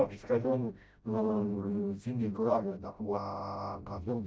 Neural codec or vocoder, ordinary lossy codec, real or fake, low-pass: codec, 16 kHz, 0.5 kbps, FreqCodec, smaller model; none; fake; none